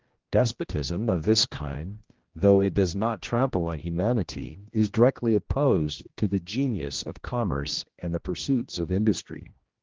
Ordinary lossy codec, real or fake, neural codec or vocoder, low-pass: Opus, 16 kbps; fake; codec, 16 kHz, 1 kbps, X-Codec, HuBERT features, trained on general audio; 7.2 kHz